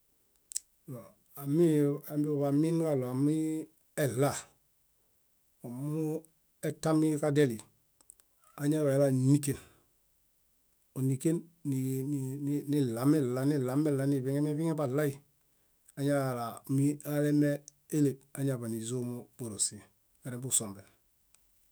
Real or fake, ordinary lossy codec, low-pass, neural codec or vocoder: fake; none; none; autoencoder, 48 kHz, 128 numbers a frame, DAC-VAE, trained on Japanese speech